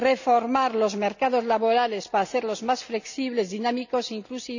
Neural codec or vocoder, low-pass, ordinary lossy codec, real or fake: none; 7.2 kHz; none; real